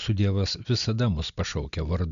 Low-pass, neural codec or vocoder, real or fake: 7.2 kHz; none; real